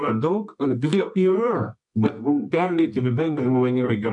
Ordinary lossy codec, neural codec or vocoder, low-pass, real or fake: MP3, 64 kbps; codec, 24 kHz, 0.9 kbps, WavTokenizer, medium music audio release; 10.8 kHz; fake